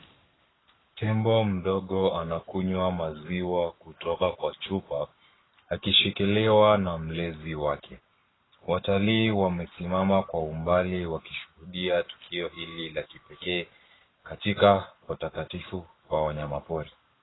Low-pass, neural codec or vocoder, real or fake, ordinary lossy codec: 7.2 kHz; codec, 44.1 kHz, 7.8 kbps, Pupu-Codec; fake; AAC, 16 kbps